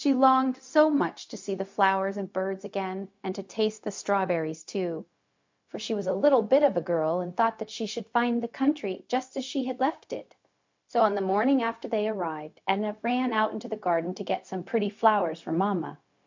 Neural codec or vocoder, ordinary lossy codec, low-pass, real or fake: codec, 16 kHz, 0.4 kbps, LongCat-Audio-Codec; MP3, 48 kbps; 7.2 kHz; fake